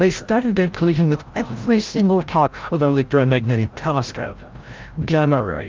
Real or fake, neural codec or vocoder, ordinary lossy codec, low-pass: fake; codec, 16 kHz, 0.5 kbps, FreqCodec, larger model; Opus, 32 kbps; 7.2 kHz